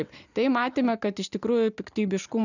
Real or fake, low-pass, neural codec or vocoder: real; 7.2 kHz; none